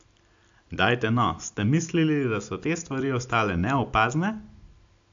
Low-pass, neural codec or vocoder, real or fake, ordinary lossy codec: 7.2 kHz; none; real; AAC, 64 kbps